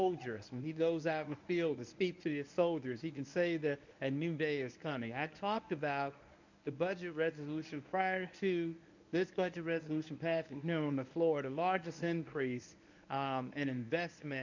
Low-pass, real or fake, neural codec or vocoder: 7.2 kHz; fake; codec, 24 kHz, 0.9 kbps, WavTokenizer, medium speech release version 1